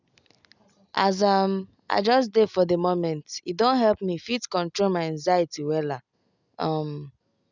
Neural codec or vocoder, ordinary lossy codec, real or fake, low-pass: none; none; real; 7.2 kHz